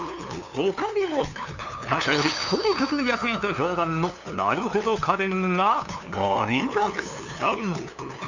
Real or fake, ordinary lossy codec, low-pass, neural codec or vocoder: fake; none; 7.2 kHz; codec, 16 kHz, 2 kbps, FunCodec, trained on LibriTTS, 25 frames a second